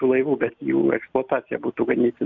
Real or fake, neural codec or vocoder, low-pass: real; none; 7.2 kHz